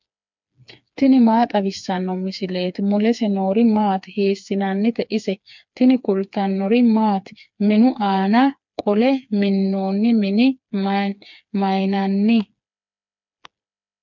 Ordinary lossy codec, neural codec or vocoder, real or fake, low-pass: MP3, 64 kbps; codec, 16 kHz, 4 kbps, FreqCodec, smaller model; fake; 7.2 kHz